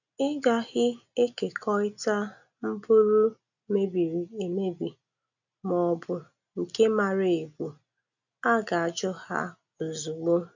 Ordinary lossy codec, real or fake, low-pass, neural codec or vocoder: none; real; 7.2 kHz; none